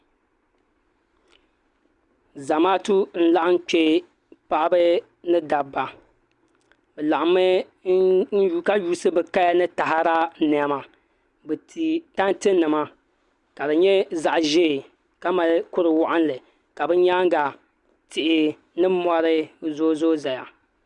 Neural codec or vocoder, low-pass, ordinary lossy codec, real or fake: none; 10.8 kHz; Opus, 64 kbps; real